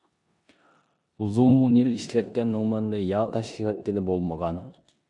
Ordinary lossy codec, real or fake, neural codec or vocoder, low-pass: AAC, 64 kbps; fake; codec, 16 kHz in and 24 kHz out, 0.9 kbps, LongCat-Audio-Codec, four codebook decoder; 10.8 kHz